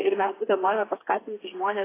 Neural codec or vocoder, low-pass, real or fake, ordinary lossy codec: codec, 16 kHz, 4 kbps, FreqCodec, smaller model; 3.6 kHz; fake; AAC, 16 kbps